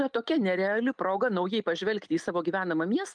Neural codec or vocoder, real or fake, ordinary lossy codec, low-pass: none; real; Opus, 32 kbps; 9.9 kHz